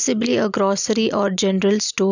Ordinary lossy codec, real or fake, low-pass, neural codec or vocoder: none; real; 7.2 kHz; none